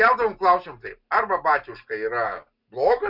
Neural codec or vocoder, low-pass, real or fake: none; 5.4 kHz; real